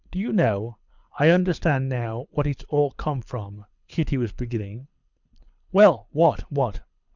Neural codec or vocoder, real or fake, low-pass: codec, 24 kHz, 6 kbps, HILCodec; fake; 7.2 kHz